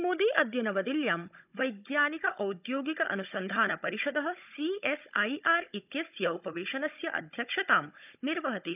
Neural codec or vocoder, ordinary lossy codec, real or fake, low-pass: codec, 16 kHz, 16 kbps, FunCodec, trained on Chinese and English, 50 frames a second; none; fake; 3.6 kHz